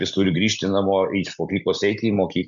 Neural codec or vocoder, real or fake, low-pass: none; real; 7.2 kHz